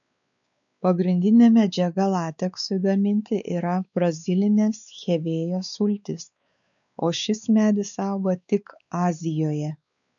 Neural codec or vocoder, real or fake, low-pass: codec, 16 kHz, 4 kbps, X-Codec, WavLM features, trained on Multilingual LibriSpeech; fake; 7.2 kHz